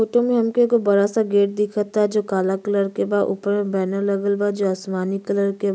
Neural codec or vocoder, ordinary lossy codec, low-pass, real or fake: none; none; none; real